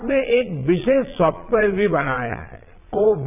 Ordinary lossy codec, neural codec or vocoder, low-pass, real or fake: none; vocoder, 22.05 kHz, 80 mel bands, Vocos; 3.6 kHz; fake